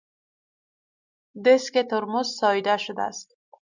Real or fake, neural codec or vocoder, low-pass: real; none; 7.2 kHz